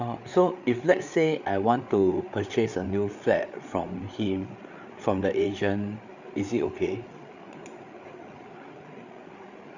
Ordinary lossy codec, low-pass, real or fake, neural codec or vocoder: none; 7.2 kHz; fake; codec, 16 kHz, 8 kbps, FreqCodec, larger model